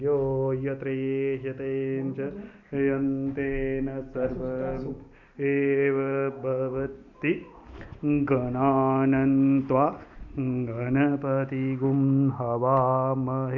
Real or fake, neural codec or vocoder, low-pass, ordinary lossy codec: real; none; 7.2 kHz; none